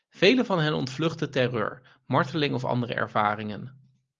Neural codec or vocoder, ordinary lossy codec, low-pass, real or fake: none; Opus, 32 kbps; 7.2 kHz; real